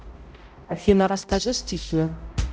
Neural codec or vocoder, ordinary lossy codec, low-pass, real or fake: codec, 16 kHz, 0.5 kbps, X-Codec, HuBERT features, trained on balanced general audio; none; none; fake